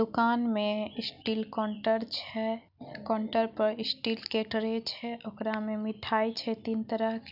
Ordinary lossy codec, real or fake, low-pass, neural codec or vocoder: none; real; 5.4 kHz; none